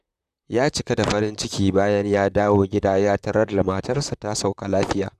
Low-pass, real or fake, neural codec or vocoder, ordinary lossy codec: 14.4 kHz; fake; vocoder, 44.1 kHz, 128 mel bands, Pupu-Vocoder; none